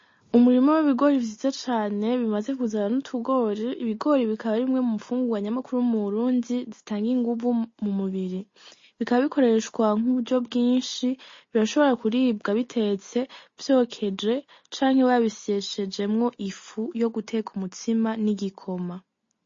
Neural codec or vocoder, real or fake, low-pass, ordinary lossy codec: none; real; 7.2 kHz; MP3, 32 kbps